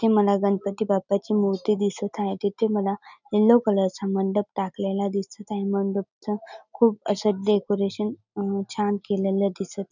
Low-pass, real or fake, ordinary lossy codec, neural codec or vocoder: 7.2 kHz; real; none; none